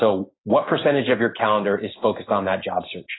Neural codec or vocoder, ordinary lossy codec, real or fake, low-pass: none; AAC, 16 kbps; real; 7.2 kHz